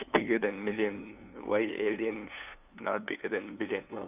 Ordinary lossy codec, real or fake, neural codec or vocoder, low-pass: none; fake; codec, 16 kHz, 2 kbps, FunCodec, trained on LibriTTS, 25 frames a second; 3.6 kHz